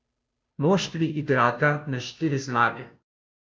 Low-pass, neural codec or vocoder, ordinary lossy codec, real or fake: none; codec, 16 kHz, 0.5 kbps, FunCodec, trained on Chinese and English, 25 frames a second; none; fake